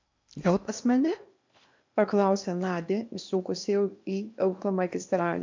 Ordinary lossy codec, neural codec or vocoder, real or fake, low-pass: MP3, 64 kbps; codec, 16 kHz in and 24 kHz out, 0.8 kbps, FocalCodec, streaming, 65536 codes; fake; 7.2 kHz